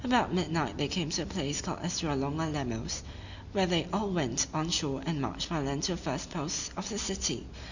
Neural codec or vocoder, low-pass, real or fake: none; 7.2 kHz; real